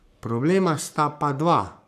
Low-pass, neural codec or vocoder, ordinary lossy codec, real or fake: 14.4 kHz; codec, 44.1 kHz, 7.8 kbps, Pupu-Codec; none; fake